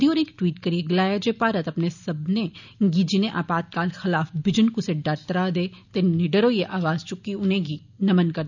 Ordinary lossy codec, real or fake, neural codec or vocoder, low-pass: none; real; none; 7.2 kHz